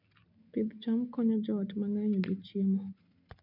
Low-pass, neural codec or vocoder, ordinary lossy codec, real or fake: 5.4 kHz; none; MP3, 48 kbps; real